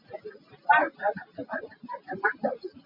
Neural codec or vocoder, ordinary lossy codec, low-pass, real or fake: none; MP3, 48 kbps; 5.4 kHz; real